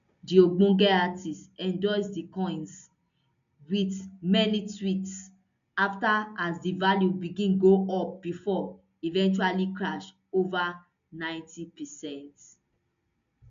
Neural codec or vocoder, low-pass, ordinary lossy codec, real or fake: none; 7.2 kHz; MP3, 64 kbps; real